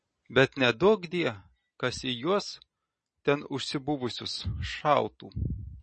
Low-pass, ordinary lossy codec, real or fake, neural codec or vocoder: 10.8 kHz; MP3, 32 kbps; real; none